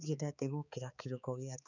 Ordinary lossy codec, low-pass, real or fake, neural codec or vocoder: none; 7.2 kHz; fake; codec, 16 kHz, 4 kbps, X-Codec, HuBERT features, trained on balanced general audio